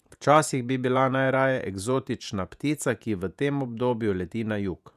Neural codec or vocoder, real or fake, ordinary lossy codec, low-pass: vocoder, 48 kHz, 128 mel bands, Vocos; fake; none; 14.4 kHz